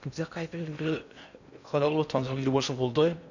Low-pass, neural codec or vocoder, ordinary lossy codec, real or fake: 7.2 kHz; codec, 16 kHz in and 24 kHz out, 0.6 kbps, FocalCodec, streaming, 2048 codes; none; fake